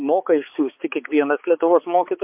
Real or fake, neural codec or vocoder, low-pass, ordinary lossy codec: fake; codec, 16 kHz, 4 kbps, X-Codec, HuBERT features, trained on balanced general audio; 3.6 kHz; MP3, 32 kbps